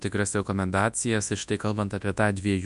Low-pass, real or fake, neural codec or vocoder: 10.8 kHz; fake; codec, 24 kHz, 0.9 kbps, WavTokenizer, large speech release